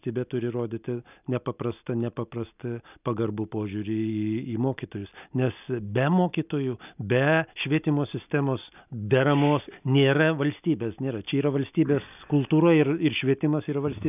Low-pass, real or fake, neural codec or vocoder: 3.6 kHz; real; none